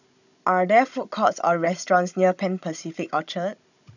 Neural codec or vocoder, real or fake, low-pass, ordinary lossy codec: codec, 16 kHz, 16 kbps, FunCodec, trained on Chinese and English, 50 frames a second; fake; 7.2 kHz; none